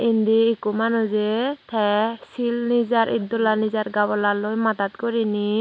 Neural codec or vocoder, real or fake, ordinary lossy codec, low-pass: none; real; none; none